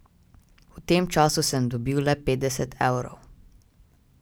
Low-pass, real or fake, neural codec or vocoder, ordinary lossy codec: none; real; none; none